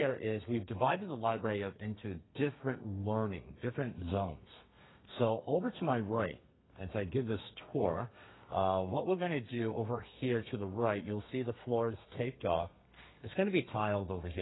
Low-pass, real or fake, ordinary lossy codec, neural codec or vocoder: 7.2 kHz; fake; AAC, 16 kbps; codec, 32 kHz, 1.9 kbps, SNAC